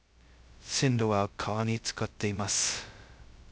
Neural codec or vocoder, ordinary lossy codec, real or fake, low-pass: codec, 16 kHz, 0.2 kbps, FocalCodec; none; fake; none